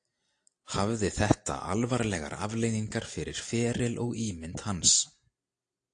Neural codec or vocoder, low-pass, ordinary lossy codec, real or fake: none; 9.9 kHz; AAC, 48 kbps; real